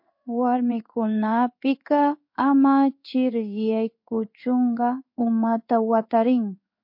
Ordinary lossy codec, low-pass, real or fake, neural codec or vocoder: MP3, 32 kbps; 5.4 kHz; fake; codec, 16 kHz in and 24 kHz out, 1 kbps, XY-Tokenizer